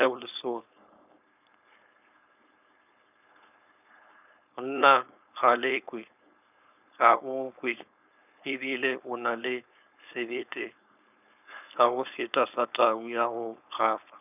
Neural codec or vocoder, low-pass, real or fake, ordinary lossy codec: codec, 16 kHz, 4.8 kbps, FACodec; 3.6 kHz; fake; none